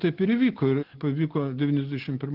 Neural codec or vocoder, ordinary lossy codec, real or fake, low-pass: none; Opus, 16 kbps; real; 5.4 kHz